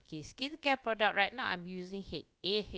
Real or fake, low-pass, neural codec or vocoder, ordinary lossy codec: fake; none; codec, 16 kHz, about 1 kbps, DyCAST, with the encoder's durations; none